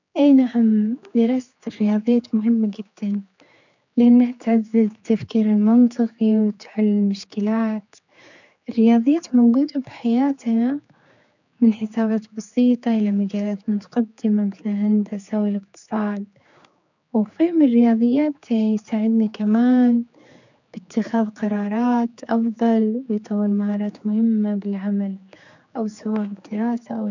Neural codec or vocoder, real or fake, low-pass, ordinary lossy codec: codec, 16 kHz, 4 kbps, X-Codec, HuBERT features, trained on general audio; fake; 7.2 kHz; none